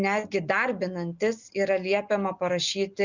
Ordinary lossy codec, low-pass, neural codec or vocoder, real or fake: Opus, 64 kbps; 7.2 kHz; none; real